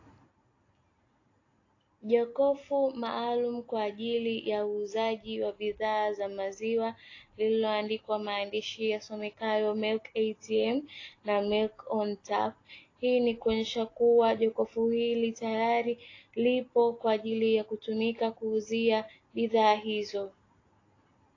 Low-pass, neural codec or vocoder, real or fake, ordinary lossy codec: 7.2 kHz; none; real; AAC, 32 kbps